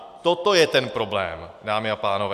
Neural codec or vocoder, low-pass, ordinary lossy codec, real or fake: vocoder, 44.1 kHz, 128 mel bands every 256 samples, BigVGAN v2; 14.4 kHz; MP3, 96 kbps; fake